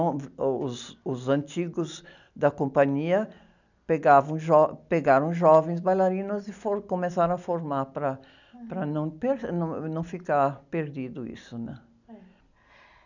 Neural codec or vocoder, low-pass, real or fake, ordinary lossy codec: none; 7.2 kHz; real; none